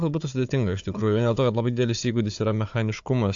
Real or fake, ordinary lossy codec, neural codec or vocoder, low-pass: fake; AAC, 64 kbps; codec, 16 kHz, 4 kbps, FunCodec, trained on Chinese and English, 50 frames a second; 7.2 kHz